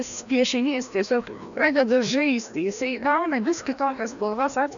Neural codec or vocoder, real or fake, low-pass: codec, 16 kHz, 1 kbps, FreqCodec, larger model; fake; 7.2 kHz